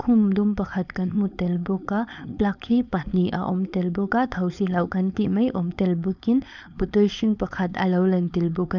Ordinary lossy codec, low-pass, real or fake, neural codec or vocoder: none; 7.2 kHz; fake; codec, 16 kHz, 4.8 kbps, FACodec